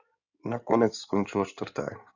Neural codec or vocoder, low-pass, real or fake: codec, 16 kHz in and 24 kHz out, 2.2 kbps, FireRedTTS-2 codec; 7.2 kHz; fake